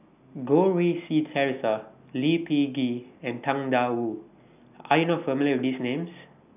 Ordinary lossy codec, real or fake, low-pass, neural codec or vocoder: none; real; 3.6 kHz; none